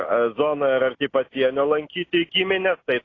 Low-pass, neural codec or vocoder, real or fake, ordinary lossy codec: 7.2 kHz; none; real; AAC, 32 kbps